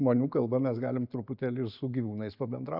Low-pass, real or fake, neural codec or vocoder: 5.4 kHz; real; none